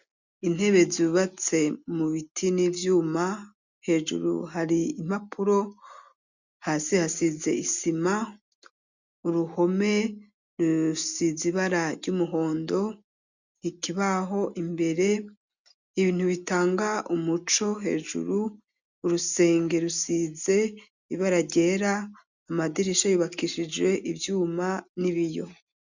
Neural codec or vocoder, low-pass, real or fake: none; 7.2 kHz; real